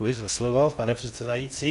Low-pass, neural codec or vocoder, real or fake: 10.8 kHz; codec, 16 kHz in and 24 kHz out, 0.6 kbps, FocalCodec, streaming, 4096 codes; fake